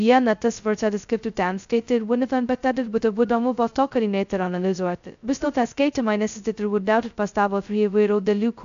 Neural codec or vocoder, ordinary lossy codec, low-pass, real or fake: codec, 16 kHz, 0.2 kbps, FocalCodec; AAC, 96 kbps; 7.2 kHz; fake